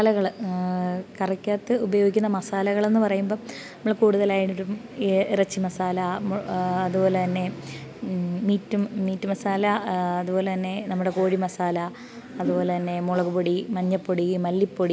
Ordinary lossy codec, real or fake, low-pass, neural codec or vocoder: none; real; none; none